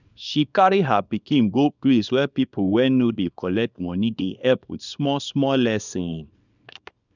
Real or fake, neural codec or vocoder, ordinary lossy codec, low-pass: fake; codec, 24 kHz, 0.9 kbps, WavTokenizer, small release; none; 7.2 kHz